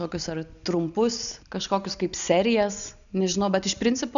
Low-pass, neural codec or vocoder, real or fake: 7.2 kHz; none; real